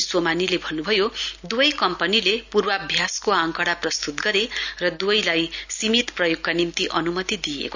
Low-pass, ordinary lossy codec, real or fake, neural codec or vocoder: 7.2 kHz; none; real; none